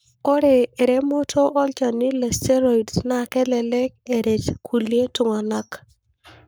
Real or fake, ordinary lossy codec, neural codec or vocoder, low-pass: fake; none; codec, 44.1 kHz, 7.8 kbps, Pupu-Codec; none